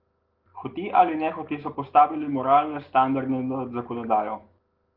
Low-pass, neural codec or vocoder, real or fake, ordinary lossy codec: 5.4 kHz; none; real; Opus, 16 kbps